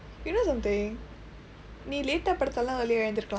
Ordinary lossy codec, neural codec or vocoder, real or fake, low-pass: none; none; real; none